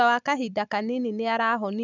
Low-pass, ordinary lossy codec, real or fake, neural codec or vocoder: 7.2 kHz; none; real; none